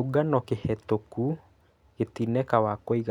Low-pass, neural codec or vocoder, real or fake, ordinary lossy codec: 19.8 kHz; none; real; none